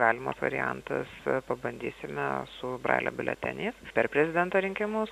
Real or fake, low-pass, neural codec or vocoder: real; 14.4 kHz; none